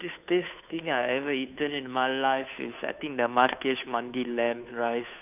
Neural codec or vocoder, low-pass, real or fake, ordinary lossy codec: codec, 16 kHz, 2 kbps, FunCodec, trained on Chinese and English, 25 frames a second; 3.6 kHz; fake; none